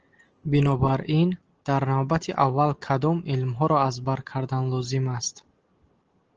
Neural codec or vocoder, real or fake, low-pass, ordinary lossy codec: none; real; 7.2 kHz; Opus, 16 kbps